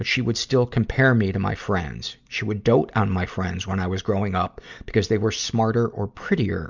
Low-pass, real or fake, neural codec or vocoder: 7.2 kHz; real; none